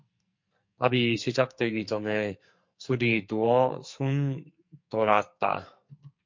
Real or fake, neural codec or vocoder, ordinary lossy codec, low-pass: fake; codec, 44.1 kHz, 2.6 kbps, SNAC; MP3, 48 kbps; 7.2 kHz